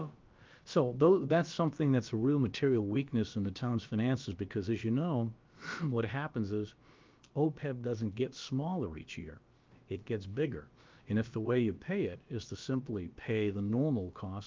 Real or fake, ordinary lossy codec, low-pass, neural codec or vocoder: fake; Opus, 24 kbps; 7.2 kHz; codec, 16 kHz, about 1 kbps, DyCAST, with the encoder's durations